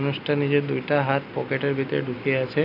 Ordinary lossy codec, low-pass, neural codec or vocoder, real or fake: none; 5.4 kHz; none; real